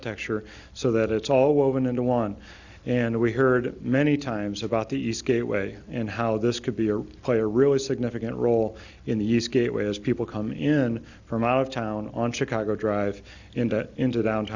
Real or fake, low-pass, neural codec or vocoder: real; 7.2 kHz; none